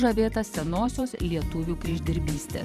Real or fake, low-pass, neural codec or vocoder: fake; 14.4 kHz; vocoder, 44.1 kHz, 128 mel bands every 512 samples, BigVGAN v2